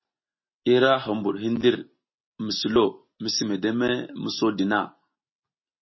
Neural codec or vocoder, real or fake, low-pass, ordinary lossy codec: none; real; 7.2 kHz; MP3, 24 kbps